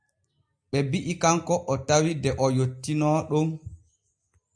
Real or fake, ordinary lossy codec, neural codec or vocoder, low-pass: real; AAC, 64 kbps; none; 9.9 kHz